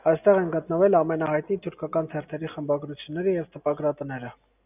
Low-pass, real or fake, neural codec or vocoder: 3.6 kHz; real; none